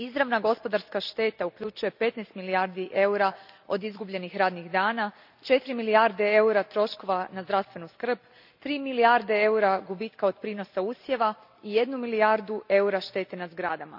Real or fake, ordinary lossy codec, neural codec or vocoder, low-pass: real; none; none; 5.4 kHz